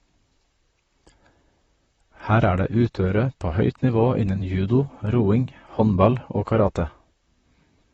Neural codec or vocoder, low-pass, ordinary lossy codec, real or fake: vocoder, 44.1 kHz, 128 mel bands, Pupu-Vocoder; 19.8 kHz; AAC, 24 kbps; fake